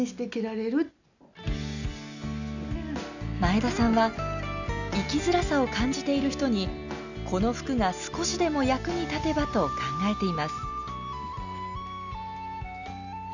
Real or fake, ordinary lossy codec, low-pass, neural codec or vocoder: real; none; 7.2 kHz; none